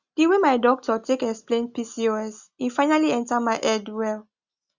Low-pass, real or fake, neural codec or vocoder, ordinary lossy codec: 7.2 kHz; real; none; Opus, 64 kbps